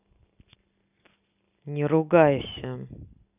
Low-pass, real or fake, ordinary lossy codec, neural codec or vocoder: 3.6 kHz; real; none; none